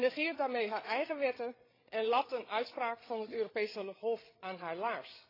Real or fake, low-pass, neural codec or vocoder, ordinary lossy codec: fake; 5.4 kHz; codec, 16 kHz, 16 kbps, FreqCodec, larger model; AAC, 24 kbps